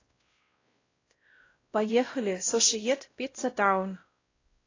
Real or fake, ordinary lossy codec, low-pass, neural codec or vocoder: fake; AAC, 32 kbps; 7.2 kHz; codec, 16 kHz, 0.5 kbps, X-Codec, WavLM features, trained on Multilingual LibriSpeech